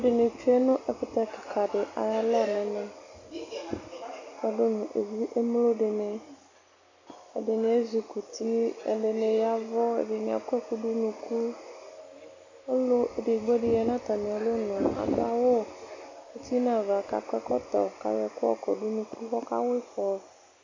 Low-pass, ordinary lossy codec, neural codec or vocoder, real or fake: 7.2 kHz; AAC, 48 kbps; none; real